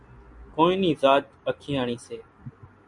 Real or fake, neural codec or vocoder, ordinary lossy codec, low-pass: real; none; Opus, 64 kbps; 10.8 kHz